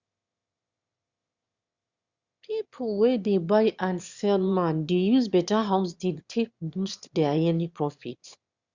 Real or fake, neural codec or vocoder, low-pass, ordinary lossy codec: fake; autoencoder, 22.05 kHz, a latent of 192 numbers a frame, VITS, trained on one speaker; 7.2 kHz; Opus, 64 kbps